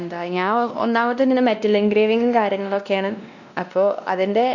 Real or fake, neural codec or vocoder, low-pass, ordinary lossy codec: fake; codec, 16 kHz, 1 kbps, X-Codec, WavLM features, trained on Multilingual LibriSpeech; 7.2 kHz; none